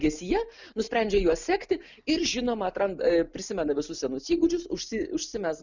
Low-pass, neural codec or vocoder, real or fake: 7.2 kHz; none; real